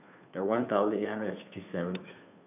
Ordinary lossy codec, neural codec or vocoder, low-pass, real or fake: none; codec, 16 kHz, 2 kbps, FunCodec, trained on Chinese and English, 25 frames a second; 3.6 kHz; fake